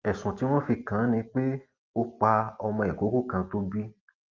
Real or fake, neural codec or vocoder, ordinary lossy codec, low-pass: real; none; Opus, 24 kbps; 7.2 kHz